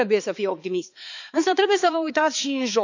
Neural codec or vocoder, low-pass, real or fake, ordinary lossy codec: codec, 16 kHz, 4 kbps, X-Codec, HuBERT features, trained on balanced general audio; 7.2 kHz; fake; none